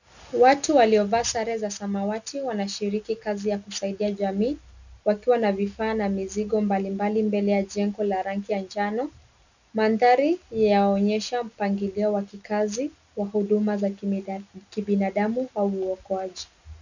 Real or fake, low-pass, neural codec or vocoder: real; 7.2 kHz; none